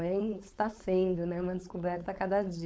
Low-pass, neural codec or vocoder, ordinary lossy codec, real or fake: none; codec, 16 kHz, 4.8 kbps, FACodec; none; fake